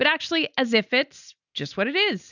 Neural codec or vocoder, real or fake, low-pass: none; real; 7.2 kHz